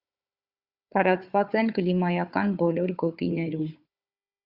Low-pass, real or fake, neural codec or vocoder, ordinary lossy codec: 5.4 kHz; fake; codec, 16 kHz, 4 kbps, FunCodec, trained on Chinese and English, 50 frames a second; Opus, 64 kbps